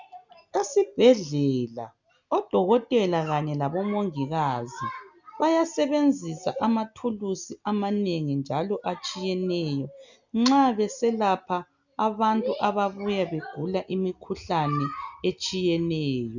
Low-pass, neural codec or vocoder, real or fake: 7.2 kHz; none; real